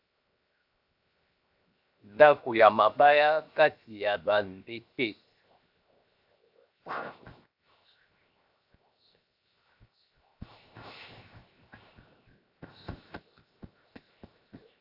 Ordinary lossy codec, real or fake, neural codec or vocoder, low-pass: AAC, 48 kbps; fake; codec, 16 kHz, 0.7 kbps, FocalCodec; 5.4 kHz